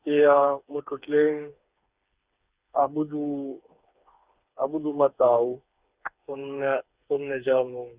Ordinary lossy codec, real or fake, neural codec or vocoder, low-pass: Opus, 64 kbps; fake; codec, 16 kHz, 4 kbps, FreqCodec, smaller model; 3.6 kHz